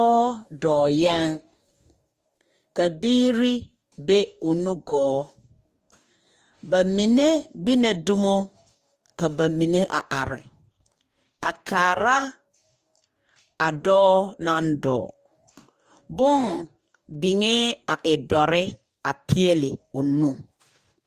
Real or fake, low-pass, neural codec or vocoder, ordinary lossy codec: fake; 14.4 kHz; codec, 44.1 kHz, 2.6 kbps, DAC; Opus, 32 kbps